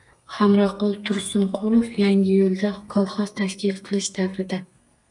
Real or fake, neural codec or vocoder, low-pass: fake; codec, 32 kHz, 1.9 kbps, SNAC; 10.8 kHz